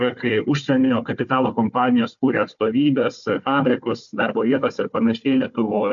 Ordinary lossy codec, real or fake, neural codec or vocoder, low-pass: MP3, 48 kbps; fake; codec, 16 kHz, 4 kbps, FunCodec, trained on Chinese and English, 50 frames a second; 7.2 kHz